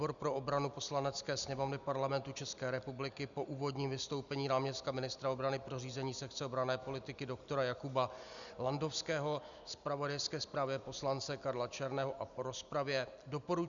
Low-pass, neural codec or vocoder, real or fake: 7.2 kHz; none; real